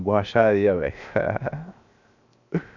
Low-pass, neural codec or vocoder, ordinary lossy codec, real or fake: 7.2 kHz; codec, 16 kHz, 0.7 kbps, FocalCodec; none; fake